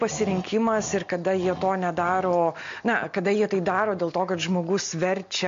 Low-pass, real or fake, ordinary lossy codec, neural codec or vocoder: 7.2 kHz; real; MP3, 48 kbps; none